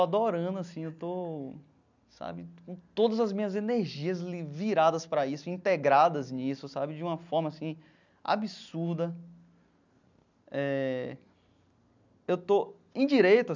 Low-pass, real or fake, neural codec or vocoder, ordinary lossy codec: 7.2 kHz; real; none; none